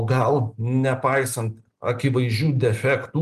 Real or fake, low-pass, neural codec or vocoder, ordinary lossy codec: real; 14.4 kHz; none; Opus, 24 kbps